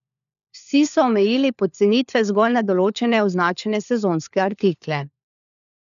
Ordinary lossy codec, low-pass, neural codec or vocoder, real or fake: none; 7.2 kHz; codec, 16 kHz, 4 kbps, FunCodec, trained on LibriTTS, 50 frames a second; fake